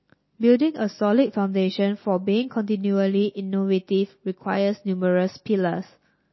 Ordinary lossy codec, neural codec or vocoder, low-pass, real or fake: MP3, 24 kbps; none; 7.2 kHz; real